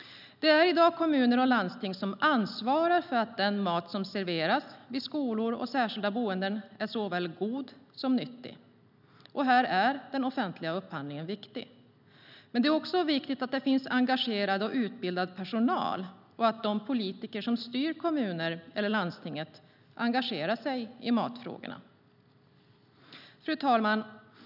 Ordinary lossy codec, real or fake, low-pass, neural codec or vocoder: none; real; 5.4 kHz; none